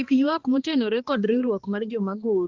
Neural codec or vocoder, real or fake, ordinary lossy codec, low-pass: codec, 16 kHz, 2 kbps, X-Codec, HuBERT features, trained on balanced general audio; fake; Opus, 16 kbps; 7.2 kHz